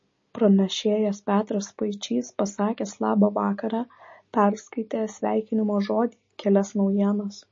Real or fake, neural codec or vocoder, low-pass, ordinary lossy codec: real; none; 7.2 kHz; MP3, 32 kbps